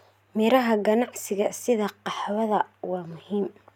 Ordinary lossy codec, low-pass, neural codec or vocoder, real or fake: none; 19.8 kHz; none; real